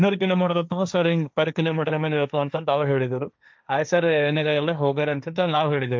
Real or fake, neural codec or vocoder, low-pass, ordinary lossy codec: fake; codec, 16 kHz, 1.1 kbps, Voila-Tokenizer; none; none